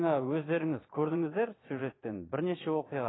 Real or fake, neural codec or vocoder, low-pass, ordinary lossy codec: fake; vocoder, 22.05 kHz, 80 mel bands, Vocos; 7.2 kHz; AAC, 16 kbps